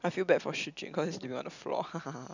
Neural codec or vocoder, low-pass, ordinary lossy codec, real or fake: none; 7.2 kHz; none; real